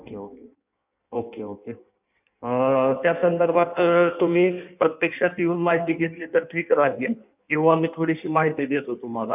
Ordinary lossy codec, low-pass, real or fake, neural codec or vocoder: none; 3.6 kHz; fake; codec, 16 kHz in and 24 kHz out, 1.1 kbps, FireRedTTS-2 codec